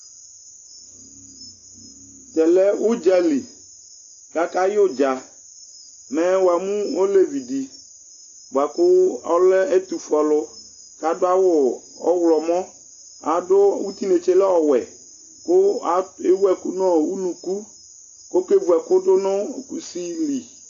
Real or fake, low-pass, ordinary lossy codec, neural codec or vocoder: real; 7.2 kHz; AAC, 48 kbps; none